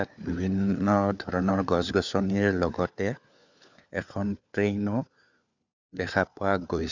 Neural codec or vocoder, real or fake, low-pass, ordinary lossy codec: codec, 16 kHz, 4 kbps, FunCodec, trained on LibriTTS, 50 frames a second; fake; 7.2 kHz; Opus, 64 kbps